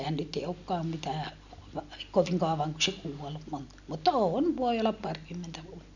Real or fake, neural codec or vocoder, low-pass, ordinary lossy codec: real; none; 7.2 kHz; none